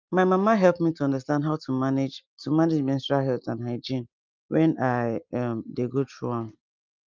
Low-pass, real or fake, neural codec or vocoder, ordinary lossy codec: 7.2 kHz; real; none; Opus, 24 kbps